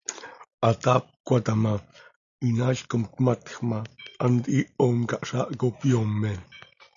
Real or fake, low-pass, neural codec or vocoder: real; 7.2 kHz; none